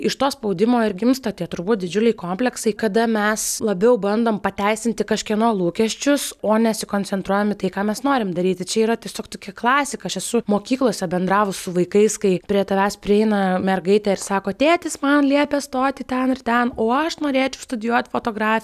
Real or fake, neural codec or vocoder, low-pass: real; none; 14.4 kHz